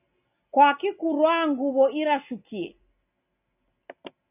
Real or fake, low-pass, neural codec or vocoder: real; 3.6 kHz; none